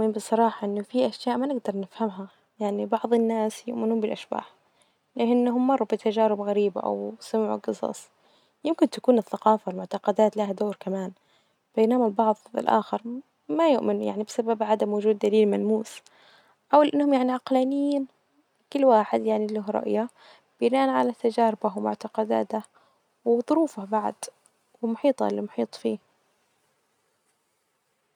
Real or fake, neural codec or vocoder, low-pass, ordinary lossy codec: real; none; 19.8 kHz; none